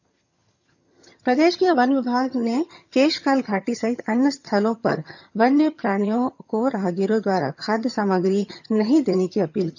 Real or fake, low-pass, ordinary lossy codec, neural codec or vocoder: fake; 7.2 kHz; none; vocoder, 22.05 kHz, 80 mel bands, HiFi-GAN